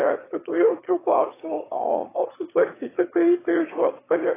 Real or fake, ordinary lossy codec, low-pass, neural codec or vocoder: fake; AAC, 16 kbps; 3.6 kHz; autoencoder, 22.05 kHz, a latent of 192 numbers a frame, VITS, trained on one speaker